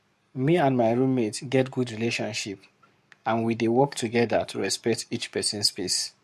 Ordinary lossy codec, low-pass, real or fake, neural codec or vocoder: MP3, 64 kbps; 14.4 kHz; fake; codec, 44.1 kHz, 7.8 kbps, Pupu-Codec